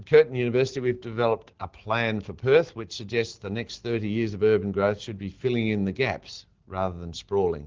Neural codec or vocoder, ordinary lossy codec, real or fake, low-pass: none; Opus, 16 kbps; real; 7.2 kHz